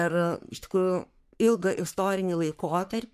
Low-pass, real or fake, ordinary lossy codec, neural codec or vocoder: 14.4 kHz; fake; MP3, 96 kbps; codec, 44.1 kHz, 3.4 kbps, Pupu-Codec